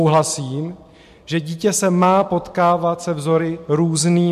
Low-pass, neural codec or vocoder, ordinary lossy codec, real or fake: 14.4 kHz; none; MP3, 64 kbps; real